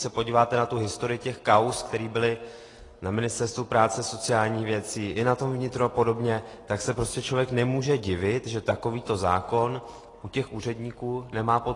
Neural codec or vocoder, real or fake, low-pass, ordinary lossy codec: none; real; 10.8 kHz; AAC, 32 kbps